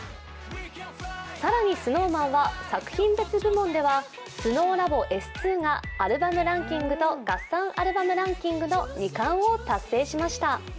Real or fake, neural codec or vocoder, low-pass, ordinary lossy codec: real; none; none; none